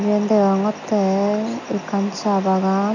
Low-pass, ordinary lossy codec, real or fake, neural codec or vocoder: 7.2 kHz; none; real; none